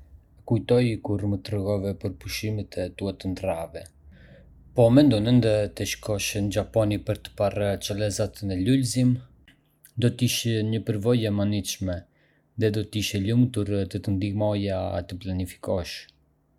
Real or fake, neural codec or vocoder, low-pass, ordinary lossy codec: real; none; 19.8 kHz; none